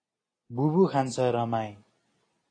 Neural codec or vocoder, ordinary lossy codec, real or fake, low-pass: none; AAC, 32 kbps; real; 9.9 kHz